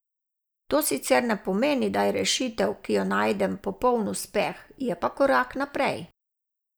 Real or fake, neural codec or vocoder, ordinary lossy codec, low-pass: real; none; none; none